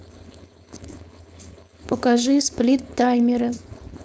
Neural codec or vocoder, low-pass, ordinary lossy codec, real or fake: codec, 16 kHz, 4.8 kbps, FACodec; none; none; fake